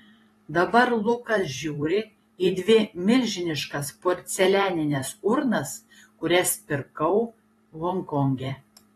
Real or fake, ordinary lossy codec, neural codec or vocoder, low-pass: fake; AAC, 32 kbps; vocoder, 44.1 kHz, 128 mel bands every 512 samples, BigVGAN v2; 19.8 kHz